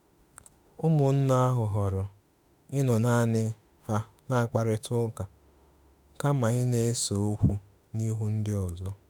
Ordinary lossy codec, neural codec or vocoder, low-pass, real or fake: none; autoencoder, 48 kHz, 32 numbers a frame, DAC-VAE, trained on Japanese speech; none; fake